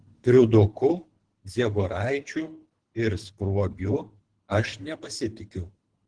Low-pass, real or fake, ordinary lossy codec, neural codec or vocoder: 9.9 kHz; fake; Opus, 16 kbps; codec, 24 kHz, 3 kbps, HILCodec